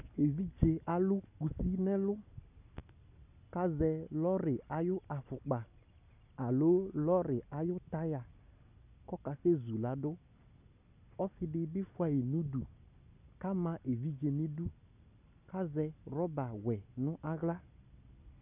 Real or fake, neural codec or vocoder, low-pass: real; none; 3.6 kHz